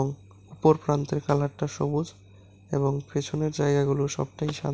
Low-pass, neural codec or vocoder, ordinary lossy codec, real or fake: none; none; none; real